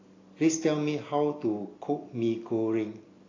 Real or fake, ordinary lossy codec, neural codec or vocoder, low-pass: real; AAC, 32 kbps; none; 7.2 kHz